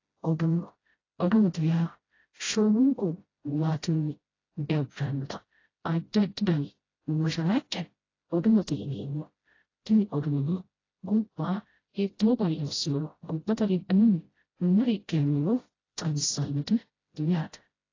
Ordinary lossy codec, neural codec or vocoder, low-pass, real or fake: AAC, 32 kbps; codec, 16 kHz, 0.5 kbps, FreqCodec, smaller model; 7.2 kHz; fake